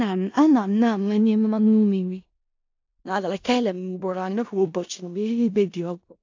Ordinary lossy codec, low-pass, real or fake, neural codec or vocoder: AAC, 48 kbps; 7.2 kHz; fake; codec, 16 kHz in and 24 kHz out, 0.4 kbps, LongCat-Audio-Codec, four codebook decoder